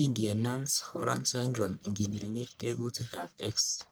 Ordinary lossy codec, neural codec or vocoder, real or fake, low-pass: none; codec, 44.1 kHz, 1.7 kbps, Pupu-Codec; fake; none